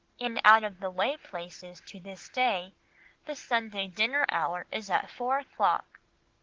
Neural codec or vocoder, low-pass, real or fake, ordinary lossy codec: none; 7.2 kHz; real; Opus, 16 kbps